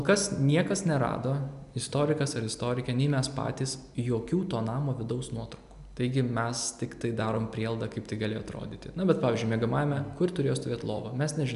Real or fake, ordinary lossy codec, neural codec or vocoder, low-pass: real; AAC, 96 kbps; none; 10.8 kHz